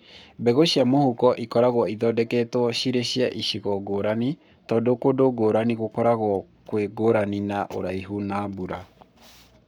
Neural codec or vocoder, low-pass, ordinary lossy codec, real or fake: codec, 44.1 kHz, 7.8 kbps, Pupu-Codec; 19.8 kHz; none; fake